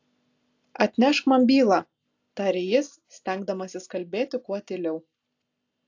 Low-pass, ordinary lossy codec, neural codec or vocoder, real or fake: 7.2 kHz; AAC, 48 kbps; none; real